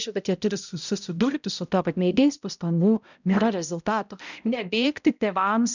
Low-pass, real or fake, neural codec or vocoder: 7.2 kHz; fake; codec, 16 kHz, 0.5 kbps, X-Codec, HuBERT features, trained on balanced general audio